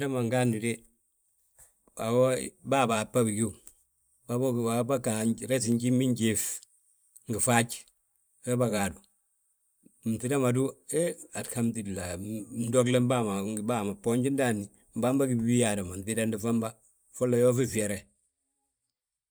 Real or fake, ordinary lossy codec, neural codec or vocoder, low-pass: fake; none; vocoder, 44.1 kHz, 128 mel bands every 512 samples, BigVGAN v2; none